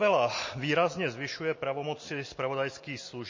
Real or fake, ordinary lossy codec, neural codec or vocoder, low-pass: real; MP3, 32 kbps; none; 7.2 kHz